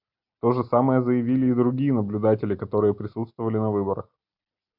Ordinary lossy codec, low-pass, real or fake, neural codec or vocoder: MP3, 48 kbps; 5.4 kHz; real; none